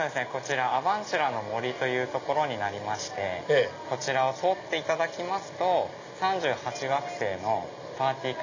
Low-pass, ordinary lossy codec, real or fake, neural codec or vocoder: 7.2 kHz; AAC, 48 kbps; real; none